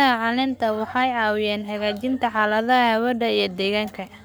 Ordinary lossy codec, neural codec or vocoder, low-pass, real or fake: none; codec, 44.1 kHz, 7.8 kbps, Pupu-Codec; none; fake